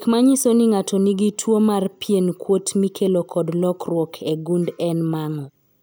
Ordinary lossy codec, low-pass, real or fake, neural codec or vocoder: none; none; real; none